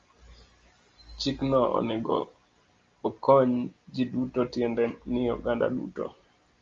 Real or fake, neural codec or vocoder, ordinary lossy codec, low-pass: real; none; Opus, 32 kbps; 7.2 kHz